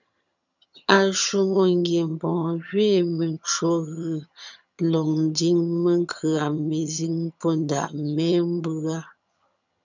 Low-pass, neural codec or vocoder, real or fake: 7.2 kHz; vocoder, 22.05 kHz, 80 mel bands, HiFi-GAN; fake